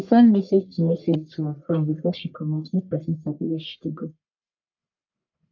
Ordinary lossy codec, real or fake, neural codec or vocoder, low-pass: none; fake; codec, 44.1 kHz, 1.7 kbps, Pupu-Codec; 7.2 kHz